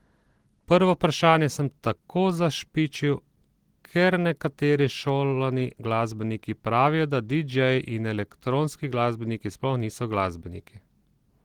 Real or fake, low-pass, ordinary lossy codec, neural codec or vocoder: real; 19.8 kHz; Opus, 16 kbps; none